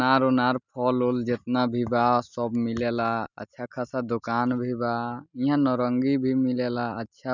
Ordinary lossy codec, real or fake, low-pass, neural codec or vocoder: none; real; 7.2 kHz; none